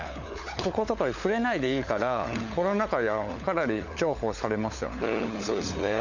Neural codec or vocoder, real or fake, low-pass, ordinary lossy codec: codec, 16 kHz, 16 kbps, FunCodec, trained on LibriTTS, 50 frames a second; fake; 7.2 kHz; none